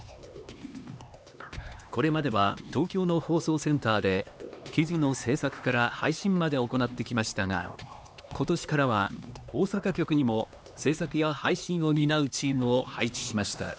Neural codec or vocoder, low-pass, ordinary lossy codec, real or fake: codec, 16 kHz, 2 kbps, X-Codec, HuBERT features, trained on LibriSpeech; none; none; fake